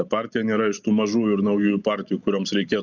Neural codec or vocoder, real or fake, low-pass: none; real; 7.2 kHz